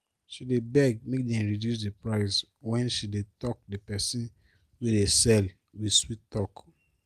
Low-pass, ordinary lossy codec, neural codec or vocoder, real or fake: 14.4 kHz; Opus, 32 kbps; none; real